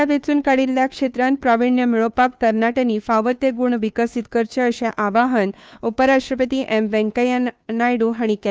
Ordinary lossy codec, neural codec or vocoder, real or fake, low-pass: none; codec, 16 kHz, 2 kbps, FunCodec, trained on Chinese and English, 25 frames a second; fake; none